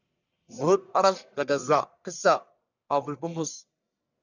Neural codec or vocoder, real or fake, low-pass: codec, 44.1 kHz, 1.7 kbps, Pupu-Codec; fake; 7.2 kHz